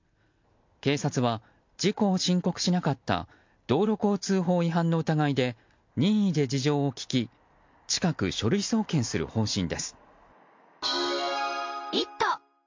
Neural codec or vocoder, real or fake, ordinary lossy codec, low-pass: none; real; MP3, 48 kbps; 7.2 kHz